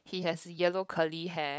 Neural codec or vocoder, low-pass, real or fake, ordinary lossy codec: codec, 16 kHz, 8 kbps, FunCodec, trained on LibriTTS, 25 frames a second; none; fake; none